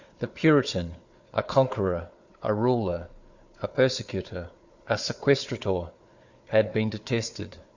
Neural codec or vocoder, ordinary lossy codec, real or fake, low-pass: codec, 16 kHz, 4 kbps, FunCodec, trained on Chinese and English, 50 frames a second; Opus, 64 kbps; fake; 7.2 kHz